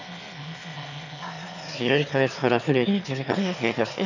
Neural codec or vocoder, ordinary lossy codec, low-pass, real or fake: autoencoder, 22.05 kHz, a latent of 192 numbers a frame, VITS, trained on one speaker; none; 7.2 kHz; fake